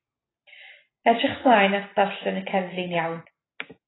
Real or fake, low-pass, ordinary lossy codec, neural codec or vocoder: real; 7.2 kHz; AAC, 16 kbps; none